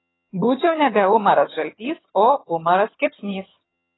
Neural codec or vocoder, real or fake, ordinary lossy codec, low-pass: vocoder, 22.05 kHz, 80 mel bands, HiFi-GAN; fake; AAC, 16 kbps; 7.2 kHz